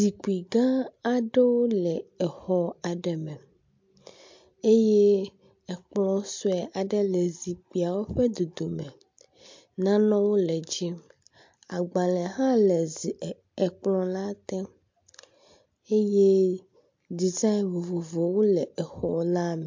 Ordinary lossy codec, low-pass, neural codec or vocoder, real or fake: MP3, 48 kbps; 7.2 kHz; none; real